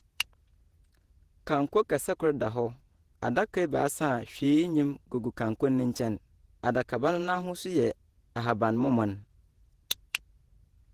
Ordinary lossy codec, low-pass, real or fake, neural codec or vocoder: Opus, 16 kbps; 14.4 kHz; fake; vocoder, 48 kHz, 128 mel bands, Vocos